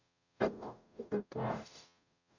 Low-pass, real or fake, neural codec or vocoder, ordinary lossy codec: 7.2 kHz; fake; codec, 44.1 kHz, 0.9 kbps, DAC; none